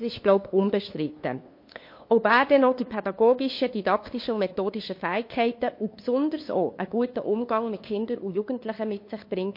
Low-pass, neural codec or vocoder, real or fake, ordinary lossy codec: 5.4 kHz; codec, 16 kHz, 2 kbps, FunCodec, trained on LibriTTS, 25 frames a second; fake; MP3, 32 kbps